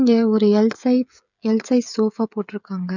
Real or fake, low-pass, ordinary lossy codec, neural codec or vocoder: fake; 7.2 kHz; none; codec, 16 kHz, 16 kbps, FreqCodec, smaller model